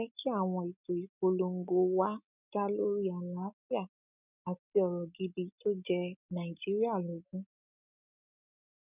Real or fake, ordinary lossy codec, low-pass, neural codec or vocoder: real; none; 3.6 kHz; none